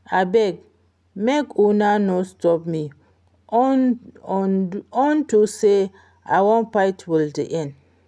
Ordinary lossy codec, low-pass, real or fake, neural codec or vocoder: none; none; real; none